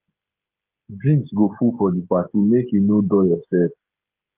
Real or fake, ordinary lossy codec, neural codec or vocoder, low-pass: fake; Opus, 32 kbps; codec, 16 kHz, 16 kbps, FreqCodec, smaller model; 3.6 kHz